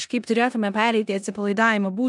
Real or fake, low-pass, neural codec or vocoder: fake; 10.8 kHz; codec, 16 kHz in and 24 kHz out, 0.9 kbps, LongCat-Audio-Codec, four codebook decoder